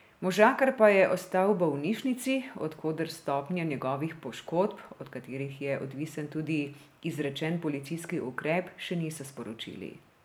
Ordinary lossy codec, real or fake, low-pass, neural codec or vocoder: none; real; none; none